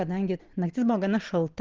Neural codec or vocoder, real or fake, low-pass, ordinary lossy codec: none; real; 7.2 kHz; Opus, 16 kbps